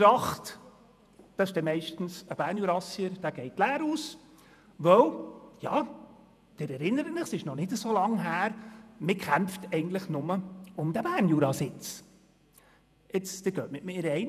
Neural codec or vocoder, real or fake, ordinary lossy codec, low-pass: vocoder, 48 kHz, 128 mel bands, Vocos; fake; none; 14.4 kHz